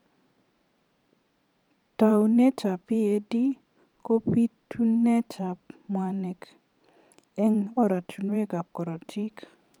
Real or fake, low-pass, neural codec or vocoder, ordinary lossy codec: fake; 19.8 kHz; vocoder, 44.1 kHz, 128 mel bands every 512 samples, BigVGAN v2; none